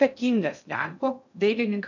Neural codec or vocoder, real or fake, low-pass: codec, 16 kHz in and 24 kHz out, 0.6 kbps, FocalCodec, streaming, 2048 codes; fake; 7.2 kHz